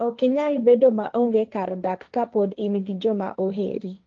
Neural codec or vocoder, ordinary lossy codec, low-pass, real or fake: codec, 16 kHz, 1.1 kbps, Voila-Tokenizer; Opus, 32 kbps; 7.2 kHz; fake